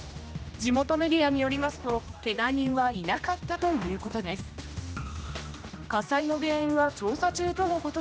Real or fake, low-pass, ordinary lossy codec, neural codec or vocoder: fake; none; none; codec, 16 kHz, 1 kbps, X-Codec, HuBERT features, trained on general audio